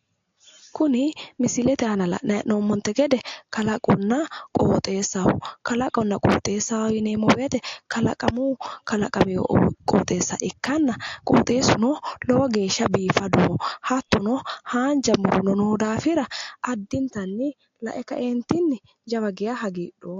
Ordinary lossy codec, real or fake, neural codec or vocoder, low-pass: AAC, 48 kbps; real; none; 7.2 kHz